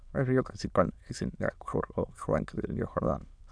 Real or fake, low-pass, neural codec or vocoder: fake; 9.9 kHz; autoencoder, 22.05 kHz, a latent of 192 numbers a frame, VITS, trained on many speakers